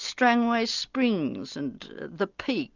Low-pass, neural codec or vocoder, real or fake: 7.2 kHz; none; real